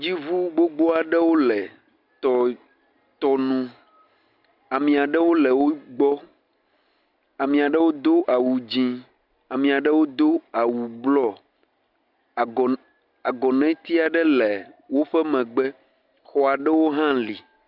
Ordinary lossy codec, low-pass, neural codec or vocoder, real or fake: Opus, 64 kbps; 5.4 kHz; none; real